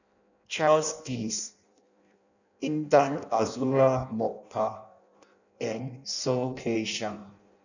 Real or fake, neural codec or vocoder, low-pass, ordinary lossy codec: fake; codec, 16 kHz in and 24 kHz out, 0.6 kbps, FireRedTTS-2 codec; 7.2 kHz; none